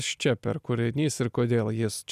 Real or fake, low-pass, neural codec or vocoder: real; 14.4 kHz; none